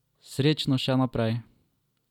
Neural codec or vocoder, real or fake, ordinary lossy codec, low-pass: none; real; none; 19.8 kHz